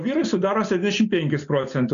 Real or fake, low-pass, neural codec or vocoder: real; 7.2 kHz; none